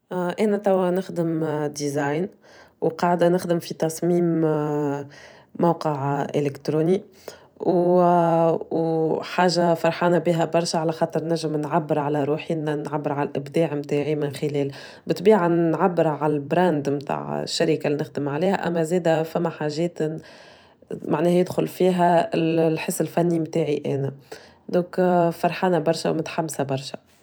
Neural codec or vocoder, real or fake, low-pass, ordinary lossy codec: vocoder, 44.1 kHz, 128 mel bands every 512 samples, BigVGAN v2; fake; none; none